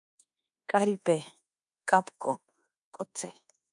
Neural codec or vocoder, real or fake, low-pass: codec, 24 kHz, 1.2 kbps, DualCodec; fake; 10.8 kHz